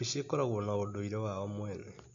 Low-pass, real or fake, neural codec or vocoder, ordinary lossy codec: 7.2 kHz; real; none; AAC, 48 kbps